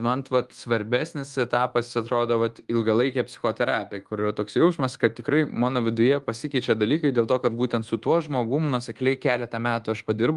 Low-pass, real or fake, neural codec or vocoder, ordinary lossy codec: 10.8 kHz; fake; codec, 24 kHz, 1.2 kbps, DualCodec; Opus, 24 kbps